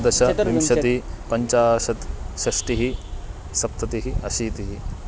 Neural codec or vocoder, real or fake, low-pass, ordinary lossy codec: none; real; none; none